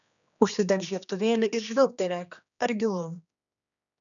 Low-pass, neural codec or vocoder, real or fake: 7.2 kHz; codec, 16 kHz, 2 kbps, X-Codec, HuBERT features, trained on general audio; fake